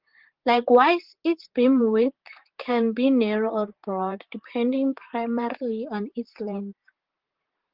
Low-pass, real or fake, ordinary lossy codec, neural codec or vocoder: 5.4 kHz; fake; Opus, 16 kbps; vocoder, 44.1 kHz, 128 mel bands, Pupu-Vocoder